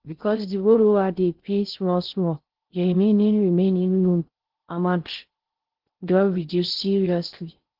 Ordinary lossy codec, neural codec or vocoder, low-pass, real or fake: Opus, 16 kbps; codec, 16 kHz in and 24 kHz out, 0.6 kbps, FocalCodec, streaming, 2048 codes; 5.4 kHz; fake